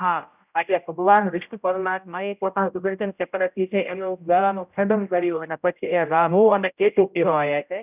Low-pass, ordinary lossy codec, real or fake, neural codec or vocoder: 3.6 kHz; none; fake; codec, 16 kHz, 0.5 kbps, X-Codec, HuBERT features, trained on general audio